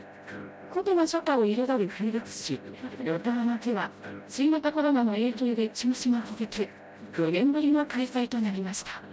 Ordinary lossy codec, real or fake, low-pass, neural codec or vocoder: none; fake; none; codec, 16 kHz, 0.5 kbps, FreqCodec, smaller model